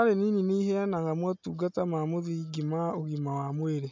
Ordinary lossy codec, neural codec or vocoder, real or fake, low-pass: MP3, 64 kbps; none; real; 7.2 kHz